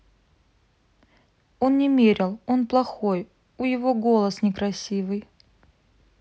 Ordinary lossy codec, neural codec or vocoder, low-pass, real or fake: none; none; none; real